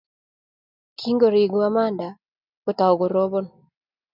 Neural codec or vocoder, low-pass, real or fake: none; 5.4 kHz; real